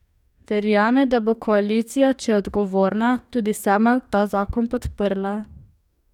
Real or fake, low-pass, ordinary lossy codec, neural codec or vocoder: fake; 19.8 kHz; none; codec, 44.1 kHz, 2.6 kbps, DAC